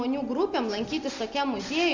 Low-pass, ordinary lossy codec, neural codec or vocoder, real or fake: 7.2 kHz; Opus, 32 kbps; none; real